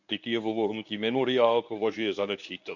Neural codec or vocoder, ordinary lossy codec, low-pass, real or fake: codec, 24 kHz, 0.9 kbps, WavTokenizer, medium speech release version 1; none; 7.2 kHz; fake